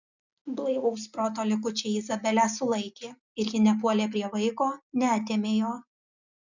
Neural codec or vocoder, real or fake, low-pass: none; real; 7.2 kHz